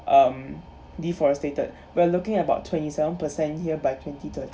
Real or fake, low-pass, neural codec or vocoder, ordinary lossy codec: real; none; none; none